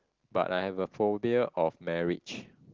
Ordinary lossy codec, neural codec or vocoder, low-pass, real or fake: Opus, 16 kbps; none; 7.2 kHz; real